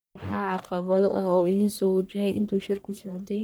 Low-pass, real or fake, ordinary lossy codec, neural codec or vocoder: none; fake; none; codec, 44.1 kHz, 1.7 kbps, Pupu-Codec